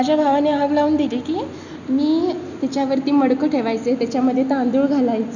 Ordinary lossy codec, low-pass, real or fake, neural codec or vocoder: none; 7.2 kHz; real; none